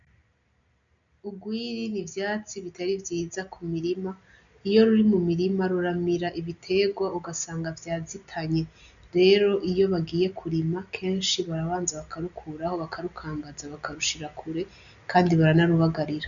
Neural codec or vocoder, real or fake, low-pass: none; real; 7.2 kHz